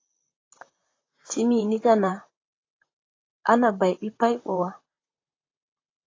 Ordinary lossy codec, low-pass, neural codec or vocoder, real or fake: AAC, 32 kbps; 7.2 kHz; vocoder, 44.1 kHz, 128 mel bands, Pupu-Vocoder; fake